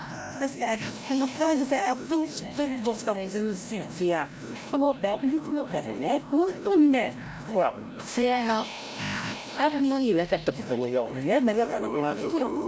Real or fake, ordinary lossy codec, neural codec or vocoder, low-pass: fake; none; codec, 16 kHz, 0.5 kbps, FreqCodec, larger model; none